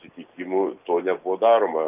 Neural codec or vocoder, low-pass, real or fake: none; 3.6 kHz; real